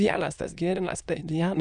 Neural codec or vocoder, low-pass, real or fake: autoencoder, 22.05 kHz, a latent of 192 numbers a frame, VITS, trained on many speakers; 9.9 kHz; fake